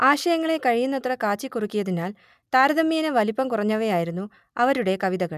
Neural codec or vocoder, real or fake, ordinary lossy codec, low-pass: none; real; none; 14.4 kHz